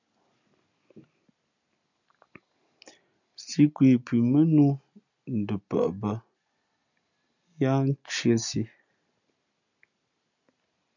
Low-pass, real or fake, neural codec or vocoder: 7.2 kHz; real; none